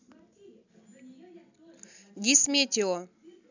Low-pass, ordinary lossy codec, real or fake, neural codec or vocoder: 7.2 kHz; none; real; none